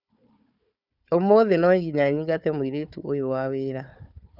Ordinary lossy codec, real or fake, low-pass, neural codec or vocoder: none; fake; 5.4 kHz; codec, 16 kHz, 4 kbps, FunCodec, trained on Chinese and English, 50 frames a second